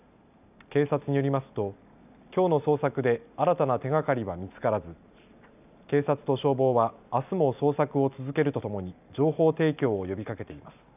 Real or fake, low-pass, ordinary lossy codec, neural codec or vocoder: real; 3.6 kHz; none; none